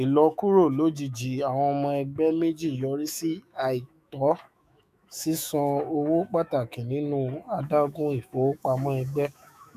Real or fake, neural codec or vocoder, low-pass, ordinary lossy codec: fake; autoencoder, 48 kHz, 128 numbers a frame, DAC-VAE, trained on Japanese speech; 14.4 kHz; none